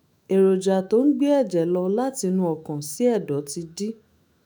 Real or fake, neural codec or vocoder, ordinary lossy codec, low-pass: fake; autoencoder, 48 kHz, 128 numbers a frame, DAC-VAE, trained on Japanese speech; none; none